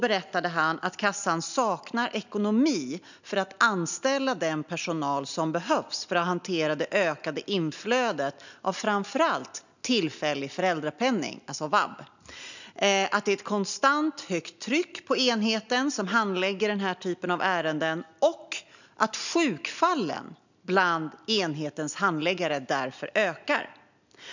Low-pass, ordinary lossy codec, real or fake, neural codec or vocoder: 7.2 kHz; none; real; none